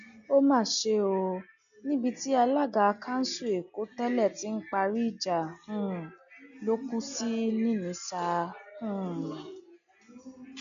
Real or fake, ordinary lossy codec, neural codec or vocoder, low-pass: real; none; none; 7.2 kHz